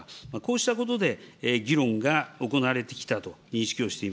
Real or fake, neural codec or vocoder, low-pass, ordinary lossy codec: real; none; none; none